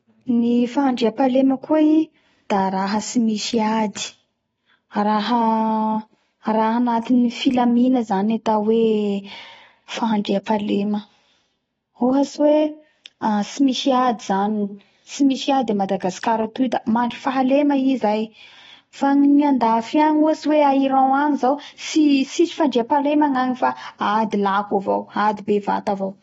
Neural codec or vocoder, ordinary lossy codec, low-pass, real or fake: none; AAC, 24 kbps; 19.8 kHz; real